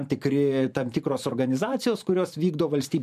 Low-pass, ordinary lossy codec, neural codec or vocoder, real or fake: 14.4 kHz; MP3, 96 kbps; vocoder, 44.1 kHz, 128 mel bands every 512 samples, BigVGAN v2; fake